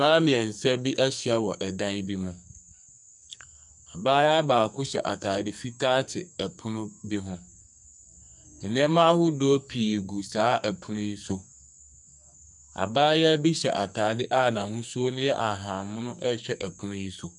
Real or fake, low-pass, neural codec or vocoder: fake; 10.8 kHz; codec, 44.1 kHz, 2.6 kbps, SNAC